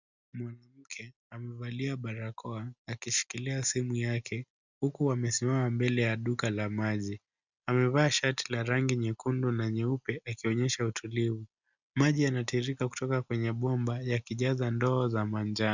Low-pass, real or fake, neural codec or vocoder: 7.2 kHz; real; none